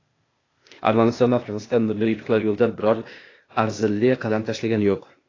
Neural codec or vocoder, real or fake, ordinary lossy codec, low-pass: codec, 16 kHz, 0.8 kbps, ZipCodec; fake; AAC, 32 kbps; 7.2 kHz